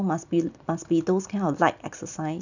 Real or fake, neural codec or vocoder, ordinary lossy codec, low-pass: real; none; none; 7.2 kHz